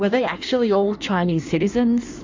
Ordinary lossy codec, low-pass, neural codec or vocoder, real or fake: MP3, 48 kbps; 7.2 kHz; codec, 16 kHz in and 24 kHz out, 1.1 kbps, FireRedTTS-2 codec; fake